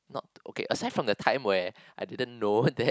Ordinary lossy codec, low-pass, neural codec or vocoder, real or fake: none; none; none; real